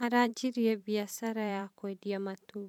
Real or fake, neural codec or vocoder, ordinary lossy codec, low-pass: fake; vocoder, 44.1 kHz, 128 mel bands every 512 samples, BigVGAN v2; none; 19.8 kHz